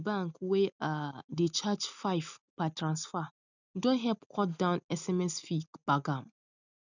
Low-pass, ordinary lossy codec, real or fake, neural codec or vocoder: 7.2 kHz; none; real; none